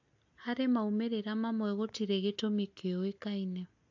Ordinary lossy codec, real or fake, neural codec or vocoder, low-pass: AAC, 48 kbps; real; none; 7.2 kHz